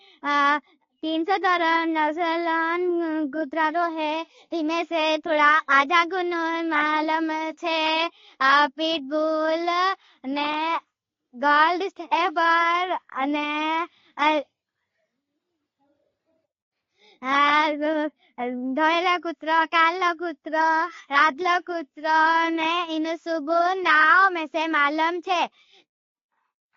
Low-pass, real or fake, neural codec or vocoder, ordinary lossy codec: 7.2 kHz; real; none; AAC, 32 kbps